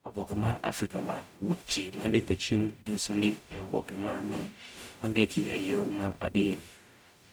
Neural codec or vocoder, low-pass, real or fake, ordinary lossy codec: codec, 44.1 kHz, 0.9 kbps, DAC; none; fake; none